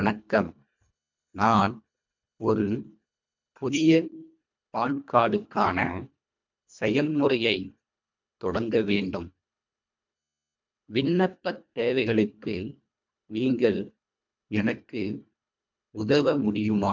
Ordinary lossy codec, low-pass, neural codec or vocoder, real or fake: MP3, 64 kbps; 7.2 kHz; codec, 24 kHz, 1.5 kbps, HILCodec; fake